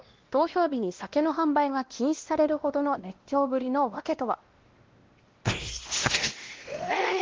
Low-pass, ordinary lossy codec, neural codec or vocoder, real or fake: 7.2 kHz; Opus, 16 kbps; codec, 16 kHz, 1 kbps, X-Codec, WavLM features, trained on Multilingual LibriSpeech; fake